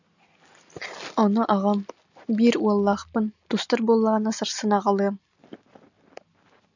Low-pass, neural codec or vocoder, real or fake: 7.2 kHz; none; real